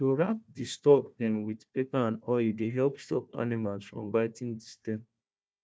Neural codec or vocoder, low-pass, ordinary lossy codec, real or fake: codec, 16 kHz, 1 kbps, FunCodec, trained on Chinese and English, 50 frames a second; none; none; fake